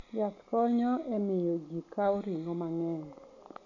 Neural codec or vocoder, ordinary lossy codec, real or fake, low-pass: none; none; real; 7.2 kHz